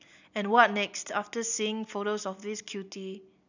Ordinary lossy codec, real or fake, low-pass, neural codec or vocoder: none; real; 7.2 kHz; none